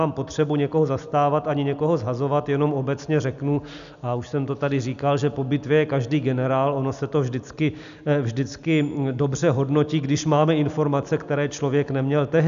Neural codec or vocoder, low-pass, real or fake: none; 7.2 kHz; real